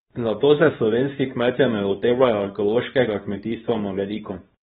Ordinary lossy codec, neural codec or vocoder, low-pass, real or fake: AAC, 16 kbps; codec, 24 kHz, 0.9 kbps, WavTokenizer, small release; 10.8 kHz; fake